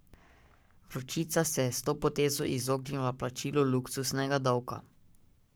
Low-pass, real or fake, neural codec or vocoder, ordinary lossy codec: none; fake; codec, 44.1 kHz, 7.8 kbps, Pupu-Codec; none